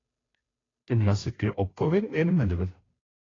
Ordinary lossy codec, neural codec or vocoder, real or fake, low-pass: AAC, 32 kbps; codec, 16 kHz, 0.5 kbps, FunCodec, trained on Chinese and English, 25 frames a second; fake; 7.2 kHz